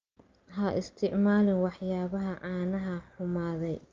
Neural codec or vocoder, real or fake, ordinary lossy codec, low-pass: none; real; Opus, 24 kbps; 7.2 kHz